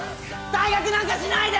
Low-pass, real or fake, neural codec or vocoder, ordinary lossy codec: none; real; none; none